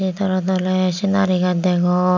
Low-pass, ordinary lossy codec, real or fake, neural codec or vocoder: 7.2 kHz; none; real; none